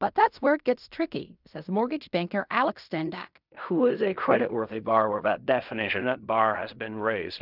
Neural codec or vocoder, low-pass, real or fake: codec, 16 kHz in and 24 kHz out, 0.4 kbps, LongCat-Audio-Codec, fine tuned four codebook decoder; 5.4 kHz; fake